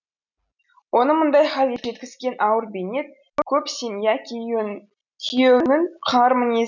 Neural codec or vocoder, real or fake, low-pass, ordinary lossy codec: none; real; 7.2 kHz; none